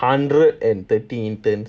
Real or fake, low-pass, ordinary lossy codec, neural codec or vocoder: real; none; none; none